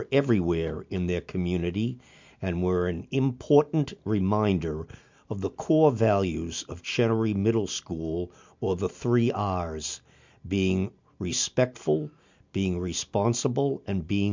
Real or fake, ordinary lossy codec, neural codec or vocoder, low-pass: real; MP3, 64 kbps; none; 7.2 kHz